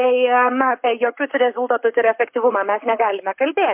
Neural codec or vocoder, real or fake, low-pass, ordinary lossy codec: codec, 44.1 kHz, 7.8 kbps, Pupu-Codec; fake; 3.6 kHz; MP3, 32 kbps